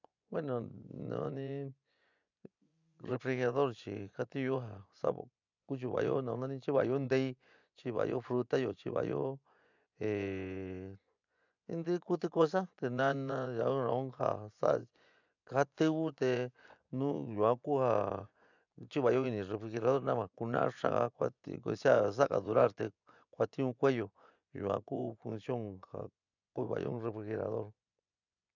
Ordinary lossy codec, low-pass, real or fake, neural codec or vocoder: none; 7.2 kHz; fake; vocoder, 24 kHz, 100 mel bands, Vocos